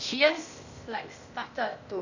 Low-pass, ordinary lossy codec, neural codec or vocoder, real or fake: 7.2 kHz; none; codec, 16 kHz, 0.8 kbps, ZipCodec; fake